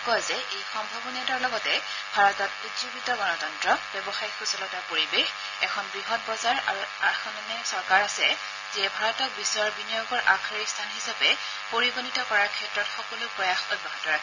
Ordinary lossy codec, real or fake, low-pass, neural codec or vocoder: none; real; 7.2 kHz; none